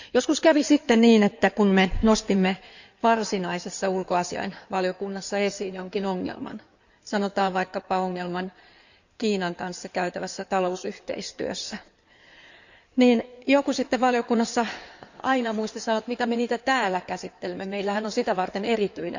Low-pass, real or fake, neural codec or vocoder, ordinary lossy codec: 7.2 kHz; fake; codec, 16 kHz in and 24 kHz out, 2.2 kbps, FireRedTTS-2 codec; none